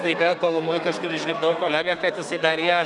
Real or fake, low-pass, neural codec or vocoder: fake; 10.8 kHz; codec, 32 kHz, 1.9 kbps, SNAC